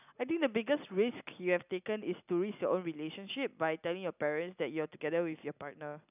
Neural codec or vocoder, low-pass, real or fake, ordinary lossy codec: none; 3.6 kHz; real; none